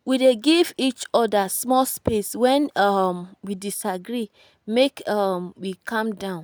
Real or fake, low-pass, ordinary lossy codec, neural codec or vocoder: real; none; none; none